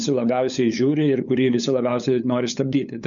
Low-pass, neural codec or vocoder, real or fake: 7.2 kHz; codec, 16 kHz, 8 kbps, FunCodec, trained on LibriTTS, 25 frames a second; fake